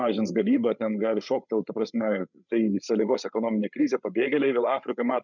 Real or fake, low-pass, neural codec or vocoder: fake; 7.2 kHz; codec, 16 kHz, 8 kbps, FreqCodec, larger model